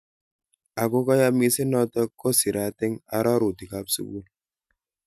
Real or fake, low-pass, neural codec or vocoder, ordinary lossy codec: real; 14.4 kHz; none; none